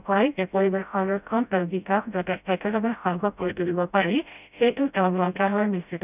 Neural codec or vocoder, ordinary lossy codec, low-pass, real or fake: codec, 16 kHz, 0.5 kbps, FreqCodec, smaller model; none; 3.6 kHz; fake